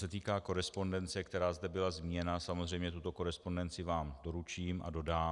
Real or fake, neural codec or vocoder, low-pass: real; none; 10.8 kHz